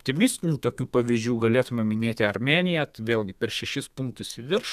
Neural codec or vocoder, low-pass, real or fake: codec, 32 kHz, 1.9 kbps, SNAC; 14.4 kHz; fake